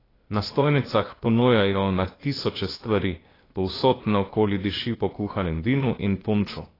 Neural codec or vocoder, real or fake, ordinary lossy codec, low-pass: codec, 16 kHz, 0.8 kbps, ZipCodec; fake; AAC, 24 kbps; 5.4 kHz